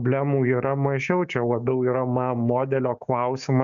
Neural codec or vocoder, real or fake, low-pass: codec, 16 kHz, 6 kbps, DAC; fake; 7.2 kHz